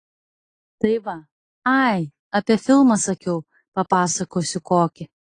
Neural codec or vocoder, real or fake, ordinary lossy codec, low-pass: none; real; AAC, 32 kbps; 9.9 kHz